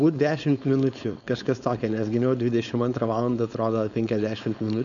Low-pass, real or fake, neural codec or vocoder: 7.2 kHz; fake; codec, 16 kHz, 4.8 kbps, FACodec